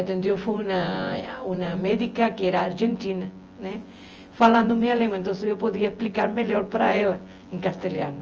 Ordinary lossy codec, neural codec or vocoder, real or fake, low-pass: Opus, 24 kbps; vocoder, 24 kHz, 100 mel bands, Vocos; fake; 7.2 kHz